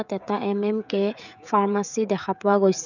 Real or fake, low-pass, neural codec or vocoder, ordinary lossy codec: fake; 7.2 kHz; codec, 16 kHz, 16 kbps, FreqCodec, smaller model; none